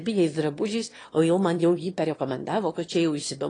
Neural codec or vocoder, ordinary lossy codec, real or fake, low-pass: autoencoder, 22.05 kHz, a latent of 192 numbers a frame, VITS, trained on one speaker; AAC, 32 kbps; fake; 9.9 kHz